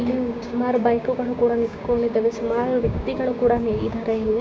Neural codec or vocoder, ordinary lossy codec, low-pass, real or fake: codec, 16 kHz, 6 kbps, DAC; none; none; fake